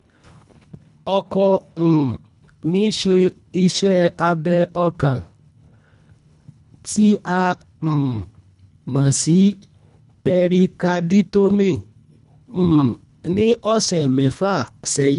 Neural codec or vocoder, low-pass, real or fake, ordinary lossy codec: codec, 24 kHz, 1.5 kbps, HILCodec; 10.8 kHz; fake; none